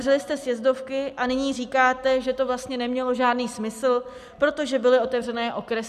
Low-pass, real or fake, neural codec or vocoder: 14.4 kHz; real; none